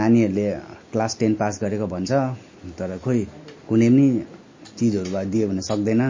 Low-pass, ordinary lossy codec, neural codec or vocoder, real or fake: 7.2 kHz; MP3, 32 kbps; none; real